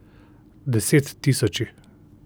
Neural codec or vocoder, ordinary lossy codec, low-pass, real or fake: none; none; none; real